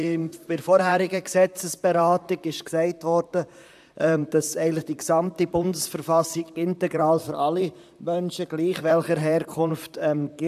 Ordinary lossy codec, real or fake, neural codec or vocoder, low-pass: AAC, 96 kbps; fake; vocoder, 44.1 kHz, 128 mel bands, Pupu-Vocoder; 14.4 kHz